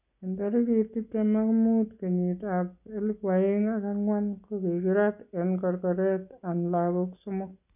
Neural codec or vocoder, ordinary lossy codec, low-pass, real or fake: none; none; 3.6 kHz; real